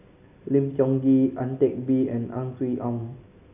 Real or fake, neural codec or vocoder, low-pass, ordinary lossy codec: real; none; 3.6 kHz; none